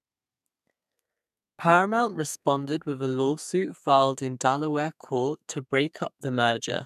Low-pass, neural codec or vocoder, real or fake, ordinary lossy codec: 14.4 kHz; codec, 32 kHz, 1.9 kbps, SNAC; fake; none